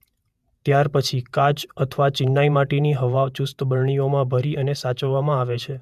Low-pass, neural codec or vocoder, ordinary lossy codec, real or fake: 14.4 kHz; vocoder, 48 kHz, 128 mel bands, Vocos; MP3, 96 kbps; fake